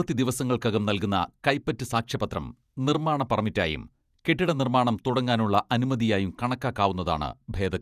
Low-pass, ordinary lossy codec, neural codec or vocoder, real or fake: 14.4 kHz; none; vocoder, 48 kHz, 128 mel bands, Vocos; fake